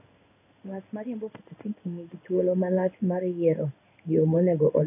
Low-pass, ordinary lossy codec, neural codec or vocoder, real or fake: 3.6 kHz; none; codec, 16 kHz in and 24 kHz out, 1 kbps, XY-Tokenizer; fake